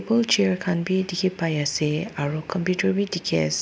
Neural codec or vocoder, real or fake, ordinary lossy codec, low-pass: none; real; none; none